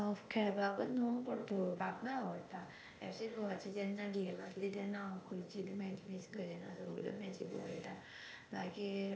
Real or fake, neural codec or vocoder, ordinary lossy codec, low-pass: fake; codec, 16 kHz, 0.8 kbps, ZipCodec; none; none